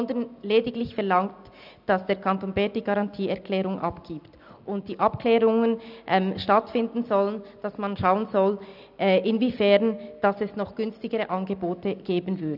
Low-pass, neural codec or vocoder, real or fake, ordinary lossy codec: 5.4 kHz; none; real; none